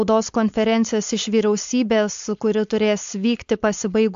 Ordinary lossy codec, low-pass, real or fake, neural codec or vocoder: AAC, 64 kbps; 7.2 kHz; fake; codec, 16 kHz, 4.8 kbps, FACodec